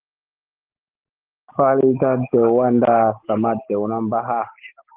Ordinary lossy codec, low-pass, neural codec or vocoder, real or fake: Opus, 16 kbps; 3.6 kHz; none; real